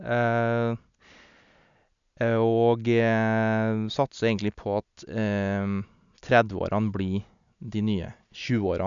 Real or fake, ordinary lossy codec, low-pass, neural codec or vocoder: real; none; 7.2 kHz; none